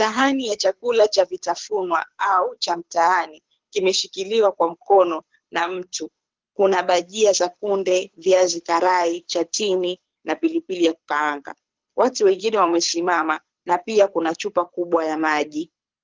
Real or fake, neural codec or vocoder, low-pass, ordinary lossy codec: fake; codec, 24 kHz, 6 kbps, HILCodec; 7.2 kHz; Opus, 24 kbps